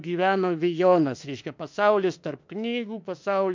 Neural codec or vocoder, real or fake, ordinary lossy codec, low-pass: autoencoder, 48 kHz, 32 numbers a frame, DAC-VAE, trained on Japanese speech; fake; MP3, 64 kbps; 7.2 kHz